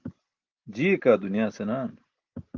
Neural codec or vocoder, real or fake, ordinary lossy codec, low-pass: none; real; Opus, 32 kbps; 7.2 kHz